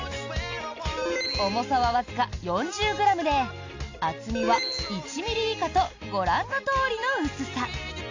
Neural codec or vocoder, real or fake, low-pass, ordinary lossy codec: none; real; 7.2 kHz; AAC, 48 kbps